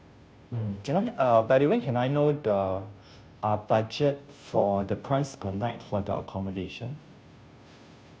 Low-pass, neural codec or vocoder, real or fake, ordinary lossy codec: none; codec, 16 kHz, 0.5 kbps, FunCodec, trained on Chinese and English, 25 frames a second; fake; none